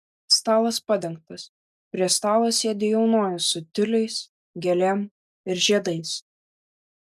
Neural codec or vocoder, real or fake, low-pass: none; real; 14.4 kHz